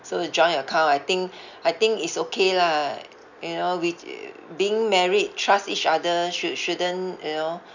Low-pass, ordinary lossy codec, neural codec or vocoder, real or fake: 7.2 kHz; none; none; real